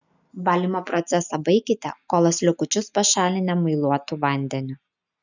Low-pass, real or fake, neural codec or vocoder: 7.2 kHz; real; none